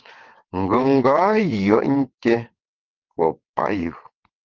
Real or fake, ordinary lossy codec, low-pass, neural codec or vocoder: fake; Opus, 16 kbps; 7.2 kHz; vocoder, 22.05 kHz, 80 mel bands, WaveNeXt